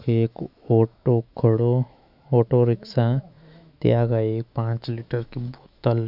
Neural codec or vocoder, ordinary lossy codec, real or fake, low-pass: autoencoder, 48 kHz, 128 numbers a frame, DAC-VAE, trained on Japanese speech; none; fake; 5.4 kHz